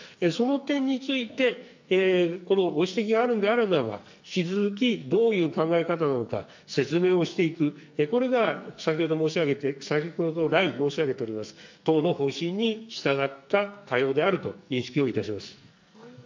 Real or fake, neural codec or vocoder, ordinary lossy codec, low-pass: fake; codec, 44.1 kHz, 2.6 kbps, SNAC; MP3, 64 kbps; 7.2 kHz